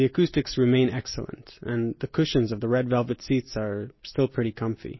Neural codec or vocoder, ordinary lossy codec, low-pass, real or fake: none; MP3, 24 kbps; 7.2 kHz; real